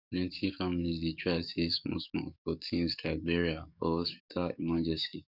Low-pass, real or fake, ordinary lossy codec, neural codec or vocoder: 5.4 kHz; fake; none; codec, 44.1 kHz, 7.8 kbps, DAC